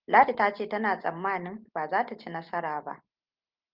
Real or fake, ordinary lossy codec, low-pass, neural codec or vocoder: real; Opus, 24 kbps; 5.4 kHz; none